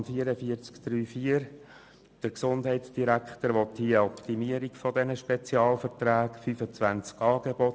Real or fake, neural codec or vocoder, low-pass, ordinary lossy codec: real; none; none; none